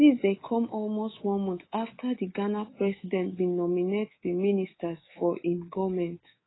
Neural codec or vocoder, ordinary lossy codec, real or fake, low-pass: codec, 24 kHz, 3.1 kbps, DualCodec; AAC, 16 kbps; fake; 7.2 kHz